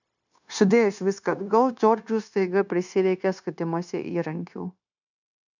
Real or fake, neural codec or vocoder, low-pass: fake; codec, 16 kHz, 0.9 kbps, LongCat-Audio-Codec; 7.2 kHz